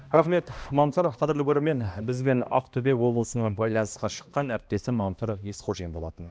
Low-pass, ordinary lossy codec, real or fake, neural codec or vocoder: none; none; fake; codec, 16 kHz, 1 kbps, X-Codec, HuBERT features, trained on balanced general audio